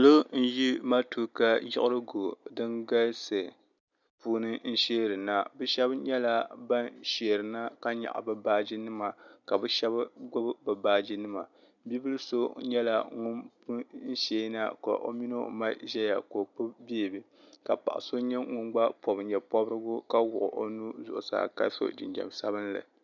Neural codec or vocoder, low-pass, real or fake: none; 7.2 kHz; real